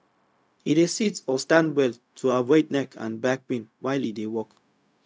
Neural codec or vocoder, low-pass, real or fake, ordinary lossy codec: codec, 16 kHz, 0.4 kbps, LongCat-Audio-Codec; none; fake; none